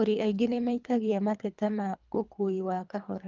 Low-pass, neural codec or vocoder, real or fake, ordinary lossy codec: 7.2 kHz; codec, 24 kHz, 3 kbps, HILCodec; fake; Opus, 24 kbps